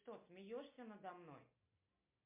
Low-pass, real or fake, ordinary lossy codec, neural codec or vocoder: 3.6 kHz; real; MP3, 32 kbps; none